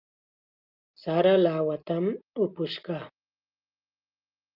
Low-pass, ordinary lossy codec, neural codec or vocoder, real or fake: 5.4 kHz; Opus, 32 kbps; none; real